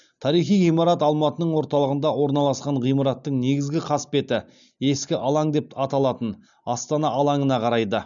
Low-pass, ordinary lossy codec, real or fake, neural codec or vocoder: 7.2 kHz; none; real; none